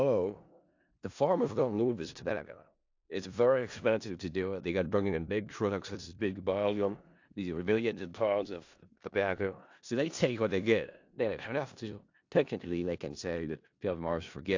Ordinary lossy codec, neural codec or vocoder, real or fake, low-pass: AAC, 48 kbps; codec, 16 kHz in and 24 kHz out, 0.4 kbps, LongCat-Audio-Codec, four codebook decoder; fake; 7.2 kHz